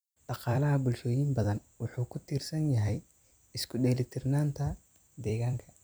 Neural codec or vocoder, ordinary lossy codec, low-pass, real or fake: vocoder, 44.1 kHz, 128 mel bands every 256 samples, BigVGAN v2; none; none; fake